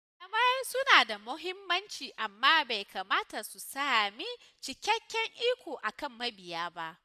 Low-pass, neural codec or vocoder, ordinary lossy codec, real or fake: 14.4 kHz; none; none; real